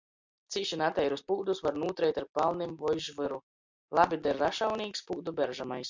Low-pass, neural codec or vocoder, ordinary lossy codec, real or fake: 7.2 kHz; none; MP3, 64 kbps; real